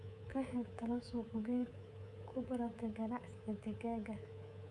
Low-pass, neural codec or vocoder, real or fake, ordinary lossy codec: none; codec, 24 kHz, 3.1 kbps, DualCodec; fake; none